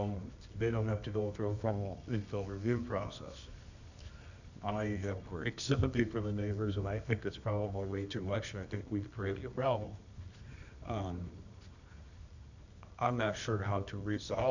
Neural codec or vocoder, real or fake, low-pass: codec, 24 kHz, 0.9 kbps, WavTokenizer, medium music audio release; fake; 7.2 kHz